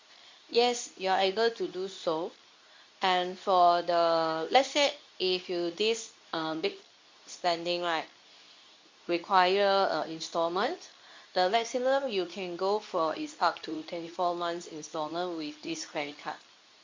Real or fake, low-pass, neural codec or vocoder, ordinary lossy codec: fake; 7.2 kHz; codec, 24 kHz, 0.9 kbps, WavTokenizer, medium speech release version 2; MP3, 48 kbps